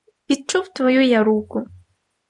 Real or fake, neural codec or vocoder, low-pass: real; none; 10.8 kHz